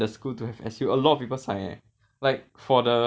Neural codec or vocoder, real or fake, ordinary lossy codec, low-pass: none; real; none; none